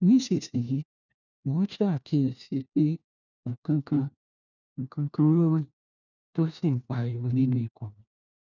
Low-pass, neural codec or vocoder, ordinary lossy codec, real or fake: 7.2 kHz; codec, 16 kHz, 1 kbps, FunCodec, trained on LibriTTS, 50 frames a second; none; fake